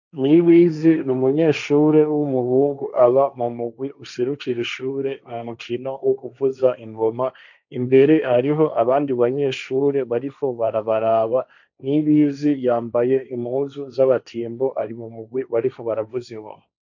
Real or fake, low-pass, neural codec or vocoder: fake; 7.2 kHz; codec, 16 kHz, 1.1 kbps, Voila-Tokenizer